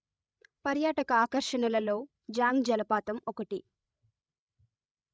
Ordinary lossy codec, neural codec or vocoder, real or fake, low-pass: none; codec, 16 kHz, 16 kbps, FreqCodec, larger model; fake; none